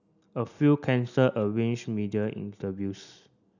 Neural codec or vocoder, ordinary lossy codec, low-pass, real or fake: none; none; 7.2 kHz; real